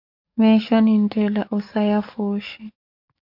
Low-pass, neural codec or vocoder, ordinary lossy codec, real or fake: 5.4 kHz; codec, 44.1 kHz, 7.8 kbps, DAC; MP3, 48 kbps; fake